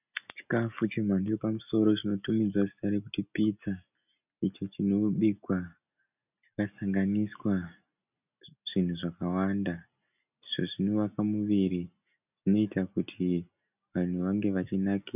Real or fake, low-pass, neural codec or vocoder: real; 3.6 kHz; none